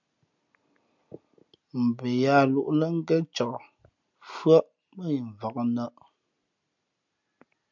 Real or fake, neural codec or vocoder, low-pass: real; none; 7.2 kHz